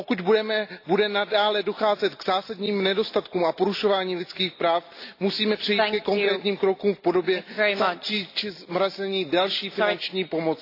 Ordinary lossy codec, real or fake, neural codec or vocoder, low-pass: AAC, 32 kbps; real; none; 5.4 kHz